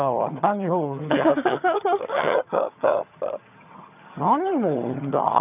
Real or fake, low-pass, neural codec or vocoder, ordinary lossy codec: fake; 3.6 kHz; vocoder, 22.05 kHz, 80 mel bands, HiFi-GAN; none